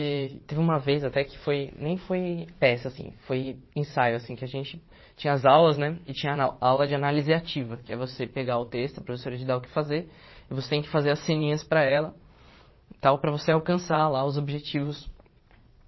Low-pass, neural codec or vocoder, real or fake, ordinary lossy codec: 7.2 kHz; vocoder, 22.05 kHz, 80 mel bands, Vocos; fake; MP3, 24 kbps